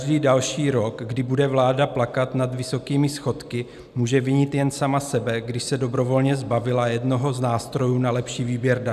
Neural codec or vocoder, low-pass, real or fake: none; 14.4 kHz; real